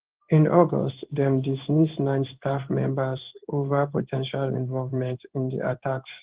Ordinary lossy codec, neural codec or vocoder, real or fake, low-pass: Opus, 16 kbps; codec, 16 kHz in and 24 kHz out, 1 kbps, XY-Tokenizer; fake; 3.6 kHz